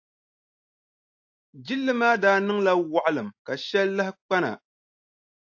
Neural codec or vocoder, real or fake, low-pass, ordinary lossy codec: none; real; 7.2 kHz; AAC, 48 kbps